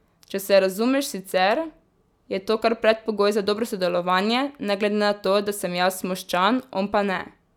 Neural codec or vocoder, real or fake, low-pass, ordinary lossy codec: none; real; 19.8 kHz; none